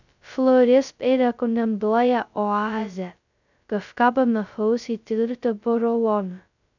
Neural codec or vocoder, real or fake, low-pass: codec, 16 kHz, 0.2 kbps, FocalCodec; fake; 7.2 kHz